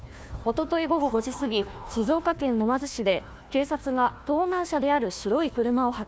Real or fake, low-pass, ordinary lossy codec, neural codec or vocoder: fake; none; none; codec, 16 kHz, 1 kbps, FunCodec, trained on Chinese and English, 50 frames a second